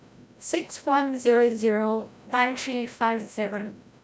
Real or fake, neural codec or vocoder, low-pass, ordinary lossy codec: fake; codec, 16 kHz, 0.5 kbps, FreqCodec, larger model; none; none